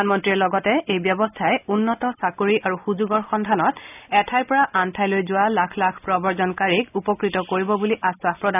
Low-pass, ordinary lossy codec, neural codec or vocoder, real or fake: 3.6 kHz; none; none; real